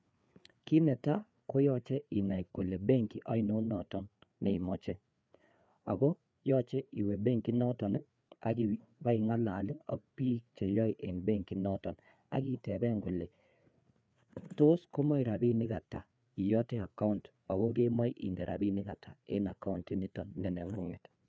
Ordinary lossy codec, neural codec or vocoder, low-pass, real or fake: none; codec, 16 kHz, 4 kbps, FreqCodec, larger model; none; fake